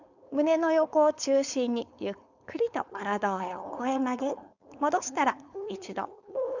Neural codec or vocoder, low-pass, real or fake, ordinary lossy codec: codec, 16 kHz, 4.8 kbps, FACodec; 7.2 kHz; fake; none